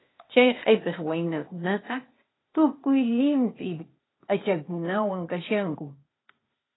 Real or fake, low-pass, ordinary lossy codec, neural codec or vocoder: fake; 7.2 kHz; AAC, 16 kbps; codec, 16 kHz, 0.8 kbps, ZipCodec